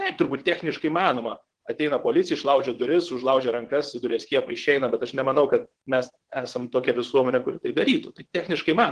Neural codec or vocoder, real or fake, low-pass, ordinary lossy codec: vocoder, 22.05 kHz, 80 mel bands, WaveNeXt; fake; 9.9 kHz; Opus, 16 kbps